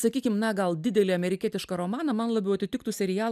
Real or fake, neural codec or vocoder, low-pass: real; none; 14.4 kHz